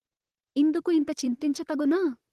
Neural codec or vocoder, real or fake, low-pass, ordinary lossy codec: vocoder, 44.1 kHz, 128 mel bands, Pupu-Vocoder; fake; 14.4 kHz; Opus, 16 kbps